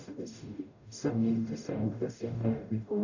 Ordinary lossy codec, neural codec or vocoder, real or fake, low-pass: none; codec, 44.1 kHz, 0.9 kbps, DAC; fake; 7.2 kHz